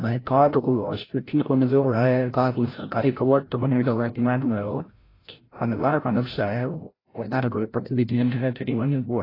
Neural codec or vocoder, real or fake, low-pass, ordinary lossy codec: codec, 16 kHz, 0.5 kbps, FreqCodec, larger model; fake; 5.4 kHz; AAC, 24 kbps